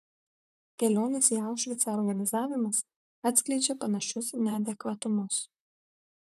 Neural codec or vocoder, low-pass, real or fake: vocoder, 44.1 kHz, 128 mel bands, Pupu-Vocoder; 14.4 kHz; fake